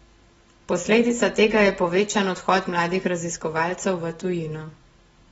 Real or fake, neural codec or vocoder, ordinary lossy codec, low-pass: real; none; AAC, 24 kbps; 19.8 kHz